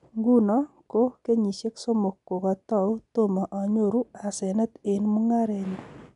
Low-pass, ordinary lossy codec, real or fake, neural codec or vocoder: 10.8 kHz; none; real; none